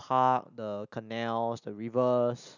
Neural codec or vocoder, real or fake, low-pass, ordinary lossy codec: none; real; 7.2 kHz; none